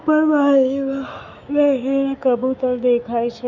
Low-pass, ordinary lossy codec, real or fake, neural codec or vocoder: 7.2 kHz; none; fake; autoencoder, 48 kHz, 128 numbers a frame, DAC-VAE, trained on Japanese speech